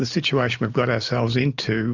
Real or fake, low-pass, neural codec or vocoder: real; 7.2 kHz; none